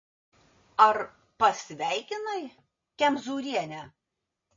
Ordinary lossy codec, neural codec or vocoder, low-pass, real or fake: MP3, 32 kbps; none; 7.2 kHz; real